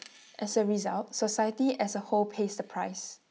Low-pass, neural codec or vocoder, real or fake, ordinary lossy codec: none; none; real; none